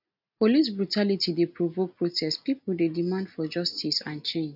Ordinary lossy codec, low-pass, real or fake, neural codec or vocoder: none; 5.4 kHz; real; none